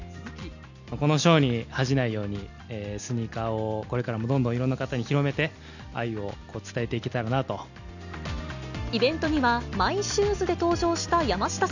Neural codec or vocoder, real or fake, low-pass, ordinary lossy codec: none; real; 7.2 kHz; none